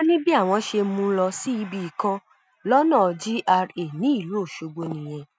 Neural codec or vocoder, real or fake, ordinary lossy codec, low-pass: none; real; none; none